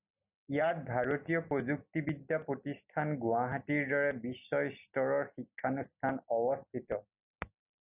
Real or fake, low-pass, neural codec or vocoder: real; 3.6 kHz; none